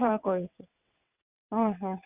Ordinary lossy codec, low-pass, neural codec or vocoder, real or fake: Opus, 64 kbps; 3.6 kHz; vocoder, 22.05 kHz, 80 mel bands, WaveNeXt; fake